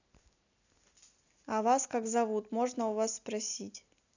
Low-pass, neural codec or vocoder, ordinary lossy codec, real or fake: 7.2 kHz; none; none; real